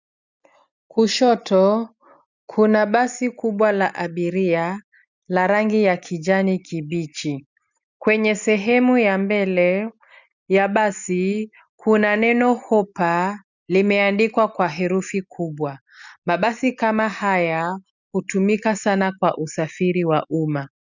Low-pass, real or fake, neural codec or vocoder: 7.2 kHz; real; none